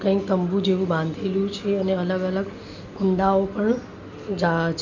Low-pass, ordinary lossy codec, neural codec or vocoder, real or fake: 7.2 kHz; none; none; real